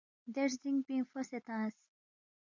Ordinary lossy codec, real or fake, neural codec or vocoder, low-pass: MP3, 64 kbps; real; none; 7.2 kHz